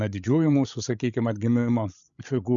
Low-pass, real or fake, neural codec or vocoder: 7.2 kHz; fake; codec, 16 kHz, 16 kbps, FreqCodec, larger model